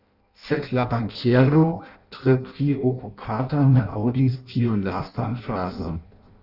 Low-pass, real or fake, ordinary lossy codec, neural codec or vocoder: 5.4 kHz; fake; Opus, 64 kbps; codec, 16 kHz in and 24 kHz out, 0.6 kbps, FireRedTTS-2 codec